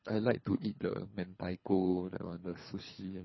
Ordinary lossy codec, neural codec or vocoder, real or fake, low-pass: MP3, 24 kbps; codec, 24 kHz, 3 kbps, HILCodec; fake; 7.2 kHz